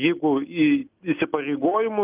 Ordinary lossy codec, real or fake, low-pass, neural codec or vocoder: Opus, 32 kbps; real; 3.6 kHz; none